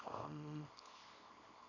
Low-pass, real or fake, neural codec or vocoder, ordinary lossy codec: 7.2 kHz; fake; codec, 24 kHz, 0.9 kbps, WavTokenizer, small release; none